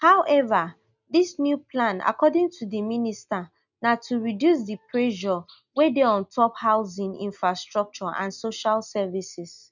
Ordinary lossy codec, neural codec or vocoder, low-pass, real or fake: none; none; 7.2 kHz; real